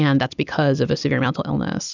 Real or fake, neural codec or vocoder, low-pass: fake; vocoder, 44.1 kHz, 128 mel bands every 256 samples, BigVGAN v2; 7.2 kHz